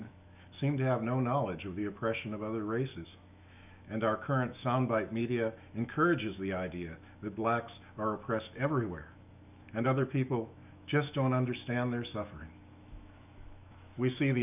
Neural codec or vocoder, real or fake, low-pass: autoencoder, 48 kHz, 128 numbers a frame, DAC-VAE, trained on Japanese speech; fake; 3.6 kHz